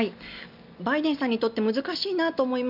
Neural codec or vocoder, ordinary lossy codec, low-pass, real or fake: none; none; 5.4 kHz; real